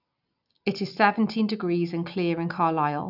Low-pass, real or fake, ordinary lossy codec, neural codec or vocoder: 5.4 kHz; real; none; none